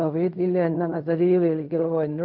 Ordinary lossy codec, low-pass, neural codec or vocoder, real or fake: none; 5.4 kHz; codec, 16 kHz in and 24 kHz out, 0.4 kbps, LongCat-Audio-Codec, fine tuned four codebook decoder; fake